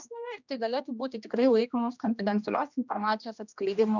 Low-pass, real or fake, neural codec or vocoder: 7.2 kHz; fake; codec, 16 kHz, 1 kbps, X-Codec, HuBERT features, trained on general audio